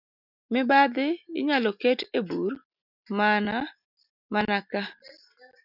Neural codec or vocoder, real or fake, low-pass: none; real; 5.4 kHz